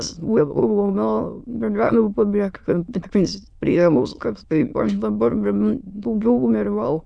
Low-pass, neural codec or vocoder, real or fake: 9.9 kHz; autoencoder, 22.05 kHz, a latent of 192 numbers a frame, VITS, trained on many speakers; fake